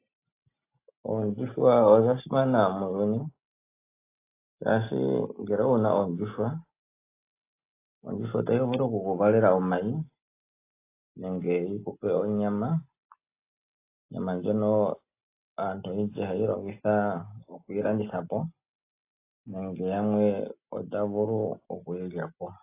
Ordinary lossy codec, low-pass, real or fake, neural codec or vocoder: AAC, 24 kbps; 3.6 kHz; real; none